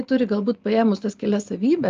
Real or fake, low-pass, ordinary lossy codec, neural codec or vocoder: real; 7.2 kHz; Opus, 24 kbps; none